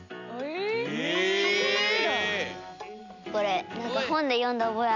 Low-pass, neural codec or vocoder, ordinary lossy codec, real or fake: 7.2 kHz; none; none; real